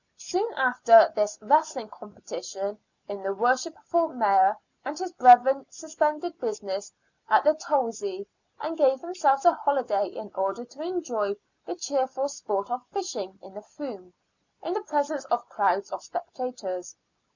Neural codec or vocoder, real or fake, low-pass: none; real; 7.2 kHz